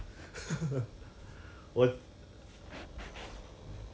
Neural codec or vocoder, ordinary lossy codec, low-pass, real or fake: none; none; none; real